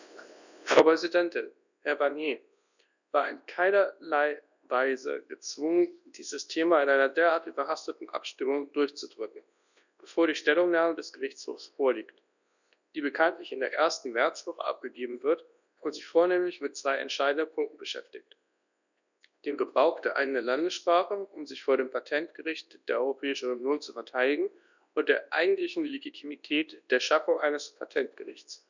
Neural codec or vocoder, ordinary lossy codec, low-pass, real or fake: codec, 24 kHz, 0.9 kbps, WavTokenizer, large speech release; none; 7.2 kHz; fake